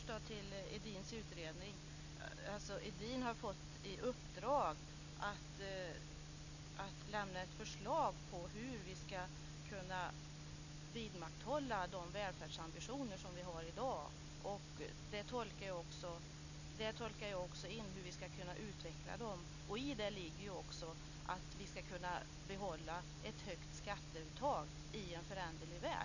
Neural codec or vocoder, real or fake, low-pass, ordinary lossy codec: none; real; 7.2 kHz; none